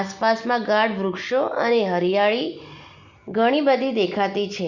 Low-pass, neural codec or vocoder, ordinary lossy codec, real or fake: 7.2 kHz; none; Opus, 64 kbps; real